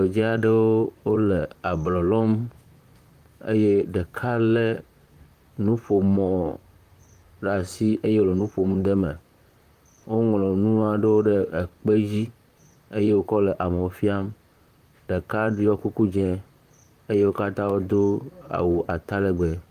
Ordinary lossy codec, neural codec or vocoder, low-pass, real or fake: Opus, 32 kbps; vocoder, 44.1 kHz, 128 mel bands, Pupu-Vocoder; 14.4 kHz; fake